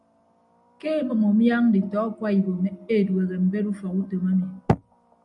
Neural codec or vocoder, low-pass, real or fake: none; 10.8 kHz; real